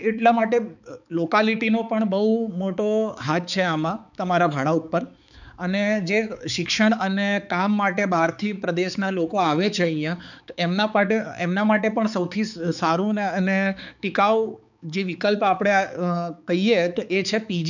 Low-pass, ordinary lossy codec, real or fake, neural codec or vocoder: 7.2 kHz; none; fake; codec, 16 kHz, 4 kbps, X-Codec, HuBERT features, trained on balanced general audio